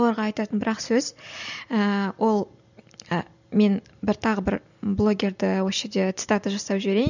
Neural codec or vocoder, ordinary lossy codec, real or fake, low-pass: none; none; real; 7.2 kHz